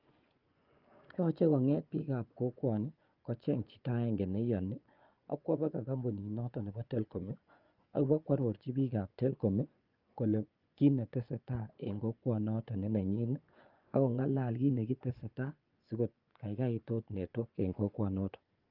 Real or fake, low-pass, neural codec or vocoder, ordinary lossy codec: fake; 5.4 kHz; vocoder, 24 kHz, 100 mel bands, Vocos; Opus, 24 kbps